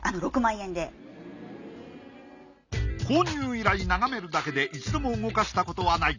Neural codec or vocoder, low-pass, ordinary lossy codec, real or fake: none; 7.2 kHz; none; real